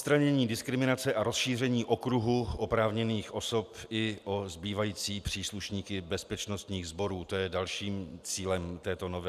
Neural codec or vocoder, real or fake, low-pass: none; real; 14.4 kHz